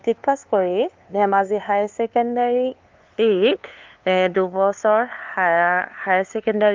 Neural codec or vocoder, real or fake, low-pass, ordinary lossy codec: codec, 16 kHz, 2 kbps, FunCodec, trained on LibriTTS, 25 frames a second; fake; 7.2 kHz; Opus, 24 kbps